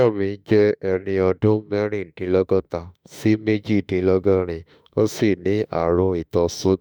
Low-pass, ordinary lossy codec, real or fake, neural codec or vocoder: none; none; fake; autoencoder, 48 kHz, 32 numbers a frame, DAC-VAE, trained on Japanese speech